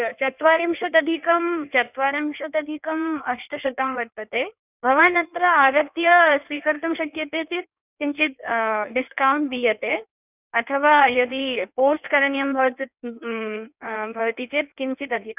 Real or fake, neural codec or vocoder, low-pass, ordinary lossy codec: fake; codec, 16 kHz in and 24 kHz out, 1.1 kbps, FireRedTTS-2 codec; 3.6 kHz; none